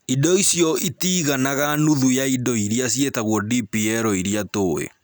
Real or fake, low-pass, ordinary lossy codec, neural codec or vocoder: real; none; none; none